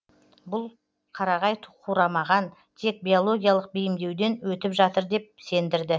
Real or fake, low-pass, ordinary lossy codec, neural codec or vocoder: real; none; none; none